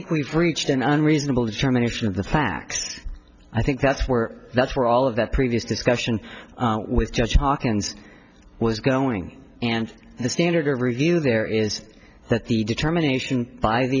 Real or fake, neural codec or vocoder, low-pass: real; none; 7.2 kHz